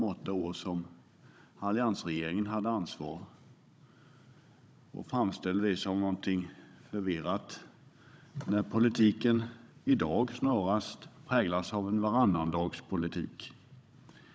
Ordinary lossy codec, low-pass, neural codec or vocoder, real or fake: none; none; codec, 16 kHz, 16 kbps, FunCodec, trained on Chinese and English, 50 frames a second; fake